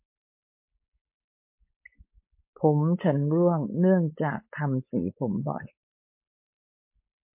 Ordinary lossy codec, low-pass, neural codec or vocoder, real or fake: MP3, 32 kbps; 3.6 kHz; codec, 16 kHz, 4.8 kbps, FACodec; fake